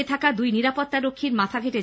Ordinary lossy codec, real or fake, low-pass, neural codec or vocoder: none; real; none; none